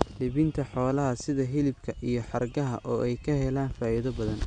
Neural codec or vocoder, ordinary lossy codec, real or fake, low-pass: none; Opus, 64 kbps; real; 9.9 kHz